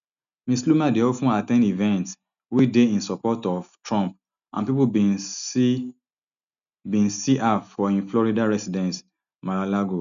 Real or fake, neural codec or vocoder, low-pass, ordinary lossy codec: real; none; 7.2 kHz; none